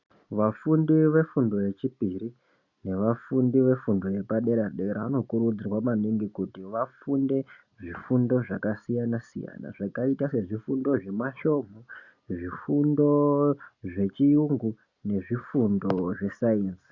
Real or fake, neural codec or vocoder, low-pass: real; none; 7.2 kHz